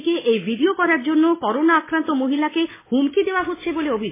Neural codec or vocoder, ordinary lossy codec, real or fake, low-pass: none; MP3, 16 kbps; real; 3.6 kHz